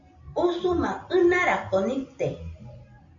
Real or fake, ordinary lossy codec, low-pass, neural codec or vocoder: real; MP3, 64 kbps; 7.2 kHz; none